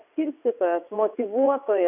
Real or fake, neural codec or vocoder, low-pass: fake; vocoder, 22.05 kHz, 80 mel bands, WaveNeXt; 3.6 kHz